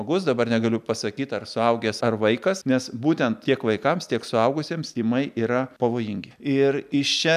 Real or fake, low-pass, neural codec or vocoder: real; 14.4 kHz; none